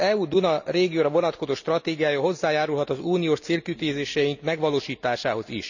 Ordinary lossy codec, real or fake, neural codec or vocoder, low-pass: none; real; none; 7.2 kHz